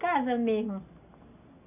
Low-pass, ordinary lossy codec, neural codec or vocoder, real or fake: 3.6 kHz; none; none; real